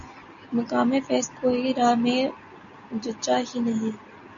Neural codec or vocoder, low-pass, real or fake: none; 7.2 kHz; real